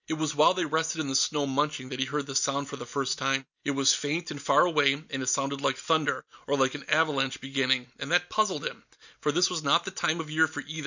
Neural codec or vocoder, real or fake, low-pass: none; real; 7.2 kHz